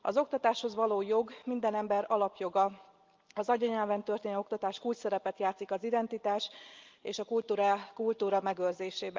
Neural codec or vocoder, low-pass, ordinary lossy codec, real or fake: none; 7.2 kHz; Opus, 32 kbps; real